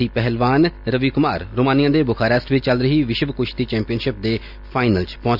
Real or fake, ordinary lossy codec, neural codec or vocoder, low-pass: real; Opus, 64 kbps; none; 5.4 kHz